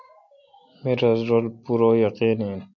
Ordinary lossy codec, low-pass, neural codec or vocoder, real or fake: MP3, 48 kbps; 7.2 kHz; none; real